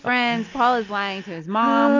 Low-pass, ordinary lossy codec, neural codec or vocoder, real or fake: 7.2 kHz; AAC, 48 kbps; none; real